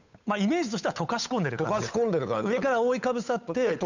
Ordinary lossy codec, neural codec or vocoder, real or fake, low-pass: none; codec, 16 kHz, 8 kbps, FunCodec, trained on Chinese and English, 25 frames a second; fake; 7.2 kHz